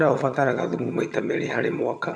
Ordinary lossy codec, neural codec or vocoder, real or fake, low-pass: none; vocoder, 22.05 kHz, 80 mel bands, HiFi-GAN; fake; none